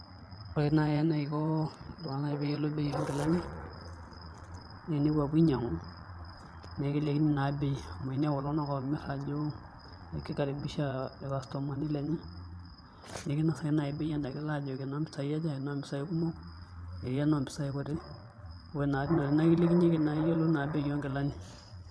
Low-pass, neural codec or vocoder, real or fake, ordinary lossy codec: none; vocoder, 22.05 kHz, 80 mel bands, Vocos; fake; none